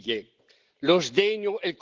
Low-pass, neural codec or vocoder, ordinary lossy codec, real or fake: 7.2 kHz; none; Opus, 16 kbps; real